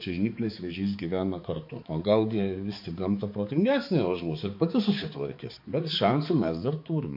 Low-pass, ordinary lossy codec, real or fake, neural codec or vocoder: 5.4 kHz; MP3, 32 kbps; fake; codec, 16 kHz, 4 kbps, X-Codec, HuBERT features, trained on balanced general audio